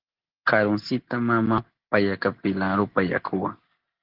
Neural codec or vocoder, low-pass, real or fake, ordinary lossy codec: none; 5.4 kHz; real; Opus, 16 kbps